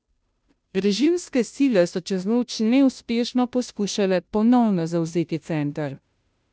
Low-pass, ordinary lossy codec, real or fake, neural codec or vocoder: none; none; fake; codec, 16 kHz, 0.5 kbps, FunCodec, trained on Chinese and English, 25 frames a second